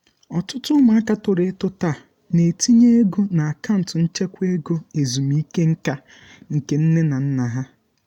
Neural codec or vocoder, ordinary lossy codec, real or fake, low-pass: none; MP3, 96 kbps; real; 19.8 kHz